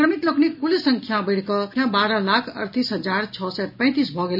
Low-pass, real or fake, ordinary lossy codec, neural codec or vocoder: 5.4 kHz; real; none; none